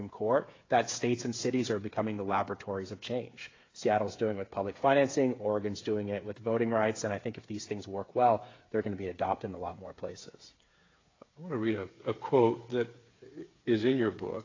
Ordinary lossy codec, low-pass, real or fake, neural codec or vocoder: AAC, 32 kbps; 7.2 kHz; fake; codec, 16 kHz, 8 kbps, FreqCodec, smaller model